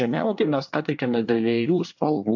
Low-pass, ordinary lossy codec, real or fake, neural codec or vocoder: 7.2 kHz; AAC, 48 kbps; fake; codec, 24 kHz, 1 kbps, SNAC